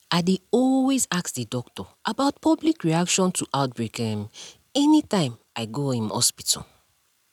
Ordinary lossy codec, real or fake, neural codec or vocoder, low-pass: none; real; none; 19.8 kHz